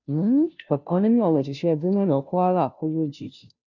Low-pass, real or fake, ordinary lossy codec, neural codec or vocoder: 7.2 kHz; fake; none; codec, 16 kHz, 0.5 kbps, FunCodec, trained on Chinese and English, 25 frames a second